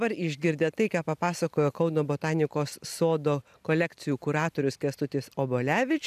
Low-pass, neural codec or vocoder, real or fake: 14.4 kHz; none; real